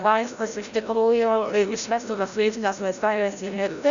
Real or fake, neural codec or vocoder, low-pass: fake; codec, 16 kHz, 0.5 kbps, FreqCodec, larger model; 7.2 kHz